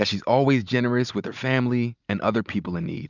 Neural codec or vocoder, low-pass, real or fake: none; 7.2 kHz; real